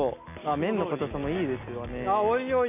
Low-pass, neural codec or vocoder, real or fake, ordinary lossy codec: 3.6 kHz; none; real; none